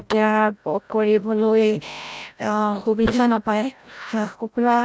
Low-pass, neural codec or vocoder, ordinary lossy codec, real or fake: none; codec, 16 kHz, 0.5 kbps, FreqCodec, larger model; none; fake